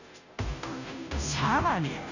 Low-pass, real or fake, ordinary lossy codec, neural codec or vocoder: 7.2 kHz; fake; none; codec, 16 kHz, 0.5 kbps, FunCodec, trained on Chinese and English, 25 frames a second